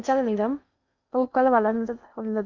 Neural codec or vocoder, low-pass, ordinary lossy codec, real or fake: codec, 16 kHz in and 24 kHz out, 0.6 kbps, FocalCodec, streaming, 2048 codes; 7.2 kHz; none; fake